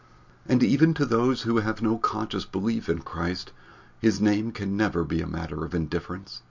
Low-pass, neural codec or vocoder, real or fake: 7.2 kHz; none; real